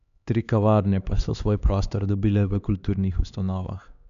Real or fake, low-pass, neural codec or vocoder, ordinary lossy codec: fake; 7.2 kHz; codec, 16 kHz, 2 kbps, X-Codec, HuBERT features, trained on LibriSpeech; none